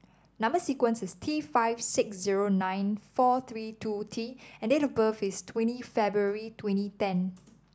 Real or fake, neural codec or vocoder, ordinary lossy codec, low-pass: real; none; none; none